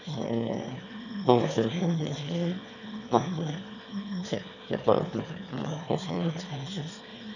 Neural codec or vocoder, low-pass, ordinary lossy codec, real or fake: autoencoder, 22.05 kHz, a latent of 192 numbers a frame, VITS, trained on one speaker; 7.2 kHz; none; fake